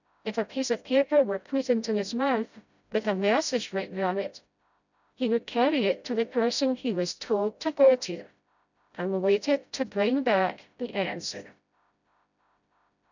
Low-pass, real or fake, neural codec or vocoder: 7.2 kHz; fake; codec, 16 kHz, 0.5 kbps, FreqCodec, smaller model